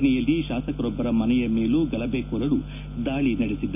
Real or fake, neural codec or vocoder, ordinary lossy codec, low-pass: real; none; none; 3.6 kHz